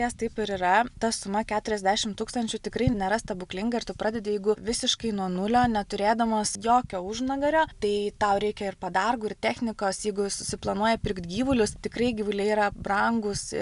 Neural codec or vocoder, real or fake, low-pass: none; real; 10.8 kHz